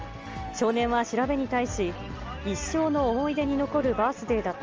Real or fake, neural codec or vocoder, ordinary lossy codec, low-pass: real; none; Opus, 24 kbps; 7.2 kHz